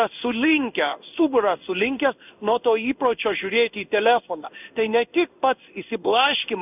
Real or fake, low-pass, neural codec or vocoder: fake; 3.6 kHz; codec, 16 kHz in and 24 kHz out, 1 kbps, XY-Tokenizer